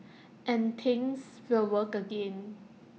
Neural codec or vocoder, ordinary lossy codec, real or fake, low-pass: none; none; real; none